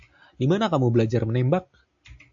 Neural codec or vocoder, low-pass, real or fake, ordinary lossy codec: none; 7.2 kHz; real; AAC, 64 kbps